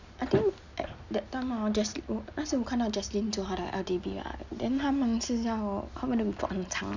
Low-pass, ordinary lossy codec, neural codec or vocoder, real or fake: 7.2 kHz; none; none; real